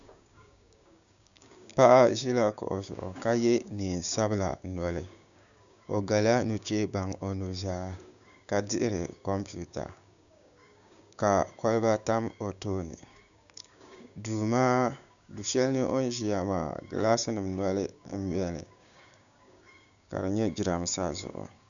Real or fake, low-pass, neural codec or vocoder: fake; 7.2 kHz; codec, 16 kHz, 6 kbps, DAC